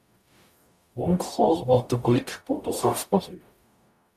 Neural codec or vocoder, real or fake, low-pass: codec, 44.1 kHz, 0.9 kbps, DAC; fake; 14.4 kHz